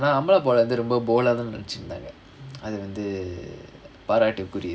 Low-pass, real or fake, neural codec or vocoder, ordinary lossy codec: none; real; none; none